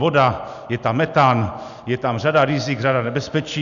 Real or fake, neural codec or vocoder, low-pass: real; none; 7.2 kHz